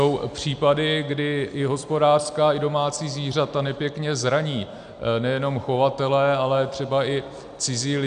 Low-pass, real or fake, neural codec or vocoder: 9.9 kHz; real; none